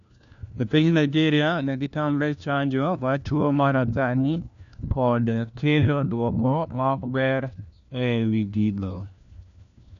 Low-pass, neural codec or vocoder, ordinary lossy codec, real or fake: 7.2 kHz; codec, 16 kHz, 1 kbps, FunCodec, trained on LibriTTS, 50 frames a second; none; fake